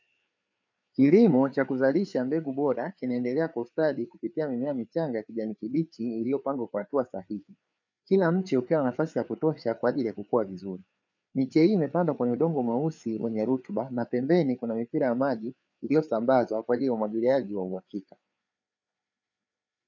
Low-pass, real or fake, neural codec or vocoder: 7.2 kHz; fake; codec, 16 kHz, 4 kbps, FreqCodec, larger model